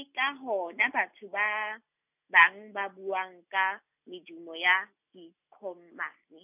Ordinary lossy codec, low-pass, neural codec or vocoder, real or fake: none; 3.6 kHz; none; real